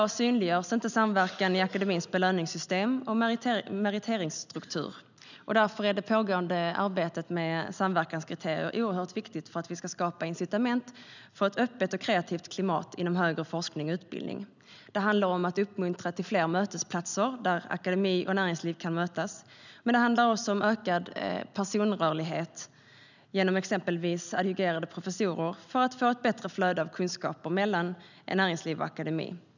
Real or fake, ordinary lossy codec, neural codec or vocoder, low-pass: real; none; none; 7.2 kHz